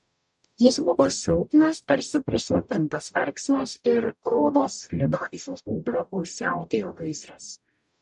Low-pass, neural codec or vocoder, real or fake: 10.8 kHz; codec, 44.1 kHz, 0.9 kbps, DAC; fake